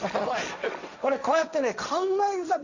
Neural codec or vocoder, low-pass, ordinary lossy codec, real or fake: codec, 16 kHz, 1.1 kbps, Voila-Tokenizer; 7.2 kHz; none; fake